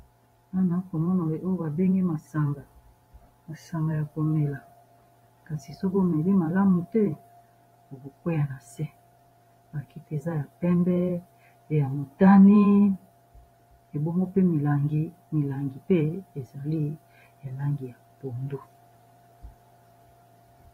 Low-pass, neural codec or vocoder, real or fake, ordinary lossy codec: 19.8 kHz; vocoder, 44.1 kHz, 128 mel bands every 512 samples, BigVGAN v2; fake; AAC, 48 kbps